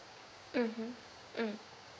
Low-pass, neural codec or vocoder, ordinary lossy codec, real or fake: none; codec, 16 kHz, 6 kbps, DAC; none; fake